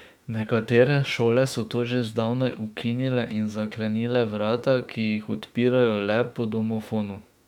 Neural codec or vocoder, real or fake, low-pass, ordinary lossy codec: autoencoder, 48 kHz, 32 numbers a frame, DAC-VAE, trained on Japanese speech; fake; 19.8 kHz; none